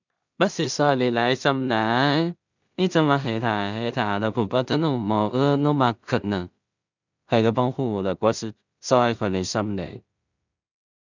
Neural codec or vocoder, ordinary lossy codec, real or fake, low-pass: codec, 16 kHz in and 24 kHz out, 0.4 kbps, LongCat-Audio-Codec, two codebook decoder; none; fake; 7.2 kHz